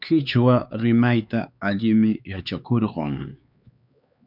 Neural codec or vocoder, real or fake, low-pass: codec, 16 kHz, 4 kbps, X-Codec, HuBERT features, trained on LibriSpeech; fake; 5.4 kHz